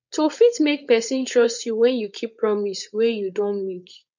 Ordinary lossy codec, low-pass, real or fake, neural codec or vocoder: none; 7.2 kHz; fake; codec, 16 kHz, 4.8 kbps, FACodec